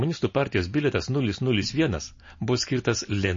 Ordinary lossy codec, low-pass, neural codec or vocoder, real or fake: MP3, 32 kbps; 7.2 kHz; none; real